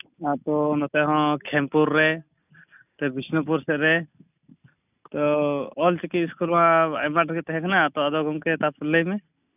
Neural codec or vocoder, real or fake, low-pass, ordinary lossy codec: none; real; 3.6 kHz; none